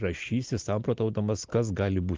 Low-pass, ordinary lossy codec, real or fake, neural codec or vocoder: 7.2 kHz; Opus, 24 kbps; real; none